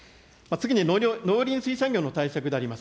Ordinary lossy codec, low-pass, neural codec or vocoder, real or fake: none; none; none; real